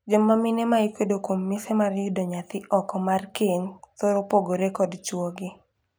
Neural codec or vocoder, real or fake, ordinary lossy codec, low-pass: none; real; none; none